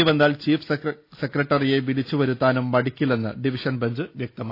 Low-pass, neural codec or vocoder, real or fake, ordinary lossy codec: 5.4 kHz; none; real; AAC, 32 kbps